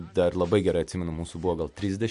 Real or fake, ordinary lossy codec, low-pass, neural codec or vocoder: real; MP3, 64 kbps; 10.8 kHz; none